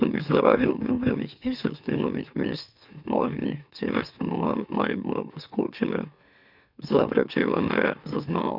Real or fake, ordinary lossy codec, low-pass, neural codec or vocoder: fake; none; 5.4 kHz; autoencoder, 44.1 kHz, a latent of 192 numbers a frame, MeloTTS